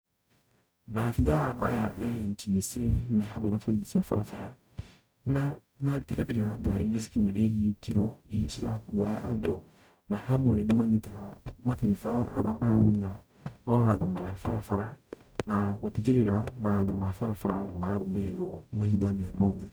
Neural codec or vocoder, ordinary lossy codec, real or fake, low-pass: codec, 44.1 kHz, 0.9 kbps, DAC; none; fake; none